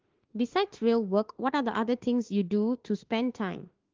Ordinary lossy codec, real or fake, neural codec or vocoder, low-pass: Opus, 16 kbps; fake; autoencoder, 48 kHz, 32 numbers a frame, DAC-VAE, trained on Japanese speech; 7.2 kHz